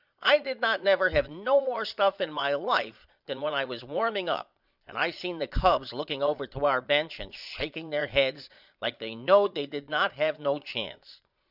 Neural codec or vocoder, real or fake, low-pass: vocoder, 22.05 kHz, 80 mel bands, Vocos; fake; 5.4 kHz